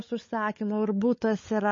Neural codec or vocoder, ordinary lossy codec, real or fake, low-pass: codec, 16 kHz, 16 kbps, FunCodec, trained on LibriTTS, 50 frames a second; MP3, 32 kbps; fake; 7.2 kHz